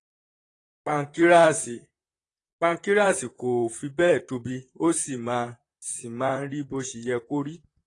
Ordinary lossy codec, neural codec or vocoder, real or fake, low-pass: AAC, 32 kbps; vocoder, 44.1 kHz, 128 mel bands, Pupu-Vocoder; fake; 10.8 kHz